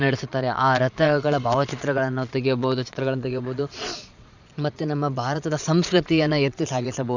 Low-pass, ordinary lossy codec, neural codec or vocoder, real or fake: 7.2 kHz; none; vocoder, 22.05 kHz, 80 mel bands, Vocos; fake